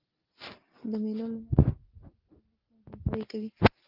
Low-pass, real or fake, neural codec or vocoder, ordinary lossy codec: 5.4 kHz; real; none; Opus, 32 kbps